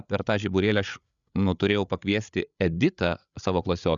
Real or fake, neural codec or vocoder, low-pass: fake; codec, 16 kHz, 8 kbps, FreqCodec, larger model; 7.2 kHz